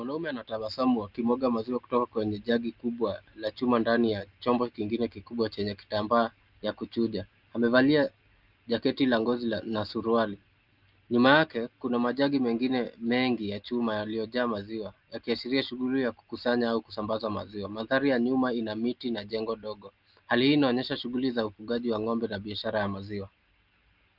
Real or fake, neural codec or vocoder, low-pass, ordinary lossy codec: real; none; 5.4 kHz; Opus, 32 kbps